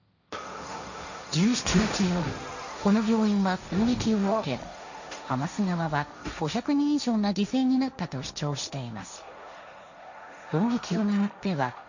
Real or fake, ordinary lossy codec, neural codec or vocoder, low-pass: fake; none; codec, 16 kHz, 1.1 kbps, Voila-Tokenizer; 7.2 kHz